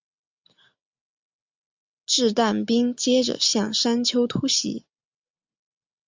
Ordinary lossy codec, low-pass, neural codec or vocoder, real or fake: MP3, 64 kbps; 7.2 kHz; none; real